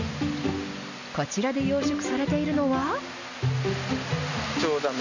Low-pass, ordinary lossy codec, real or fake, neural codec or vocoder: 7.2 kHz; none; real; none